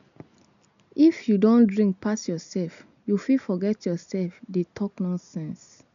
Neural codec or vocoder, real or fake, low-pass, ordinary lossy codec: none; real; 7.2 kHz; none